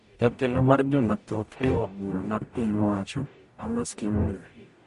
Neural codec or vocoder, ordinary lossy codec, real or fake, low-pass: codec, 44.1 kHz, 0.9 kbps, DAC; MP3, 48 kbps; fake; 14.4 kHz